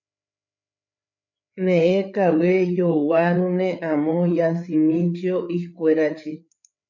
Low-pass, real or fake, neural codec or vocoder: 7.2 kHz; fake; codec, 16 kHz, 4 kbps, FreqCodec, larger model